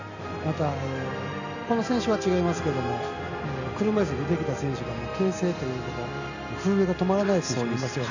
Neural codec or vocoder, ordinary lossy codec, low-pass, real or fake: none; none; 7.2 kHz; real